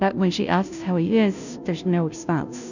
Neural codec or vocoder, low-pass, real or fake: codec, 16 kHz, 0.5 kbps, FunCodec, trained on Chinese and English, 25 frames a second; 7.2 kHz; fake